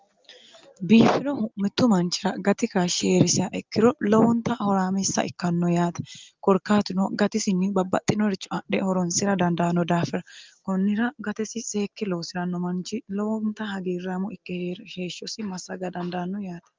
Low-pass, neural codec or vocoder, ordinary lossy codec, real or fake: 7.2 kHz; none; Opus, 32 kbps; real